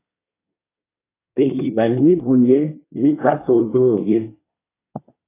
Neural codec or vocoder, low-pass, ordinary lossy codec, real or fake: codec, 24 kHz, 1 kbps, SNAC; 3.6 kHz; AAC, 16 kbps; fake